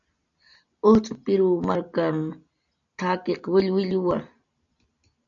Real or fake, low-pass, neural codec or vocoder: real; 7.2 kHz; none